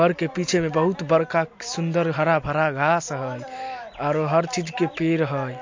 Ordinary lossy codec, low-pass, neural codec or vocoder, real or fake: MP3, 48 kbps; 7.2 kHz; none; real